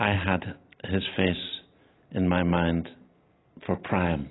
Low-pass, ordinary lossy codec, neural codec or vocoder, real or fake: 7.2 kHz; AAC, 16 kbps; none; real